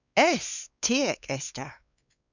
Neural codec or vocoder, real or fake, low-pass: codec, 16 kHz, 4 kbps, X-Codec, WavLM features, trained on Multilingual LibriSpeech; fake; 7.2 kHz